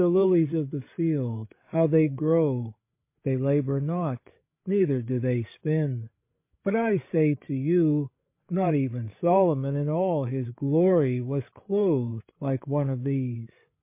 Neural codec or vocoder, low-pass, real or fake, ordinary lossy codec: codec, 16 kHz, 16 kbps, FreqCodec, larger model; 3.6 kHz; fake; MP3, 24 kbps